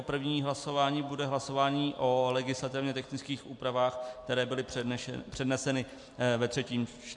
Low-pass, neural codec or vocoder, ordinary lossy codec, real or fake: 10.8 kHz; none; MP3, 64 kbps; real